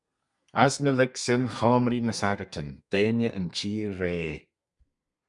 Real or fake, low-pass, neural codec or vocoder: fake; 10.8 kHz; codec, 32 kHz, 1.9 kbps, SNAC